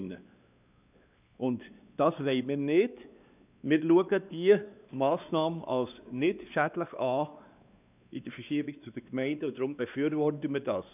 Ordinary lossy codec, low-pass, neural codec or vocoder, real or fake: none; 3.6 kHz; codec, 16 kHz, 2 kbps, X-Codec, WavLM features, trained on Multilingual LibriSpeech; fake